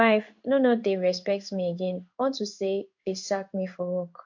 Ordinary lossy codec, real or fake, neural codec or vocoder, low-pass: MP3, 48 kbps; fake; codec, 16 kHz in and 24 kHz out, 1 kbps, XY-Tokenizer; 7.2 kHz